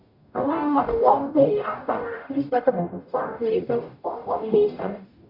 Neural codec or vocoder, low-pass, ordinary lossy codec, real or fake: codec, 44.1 kHz, 0.9 kbps, DAC; 5.4 kHz; none; fake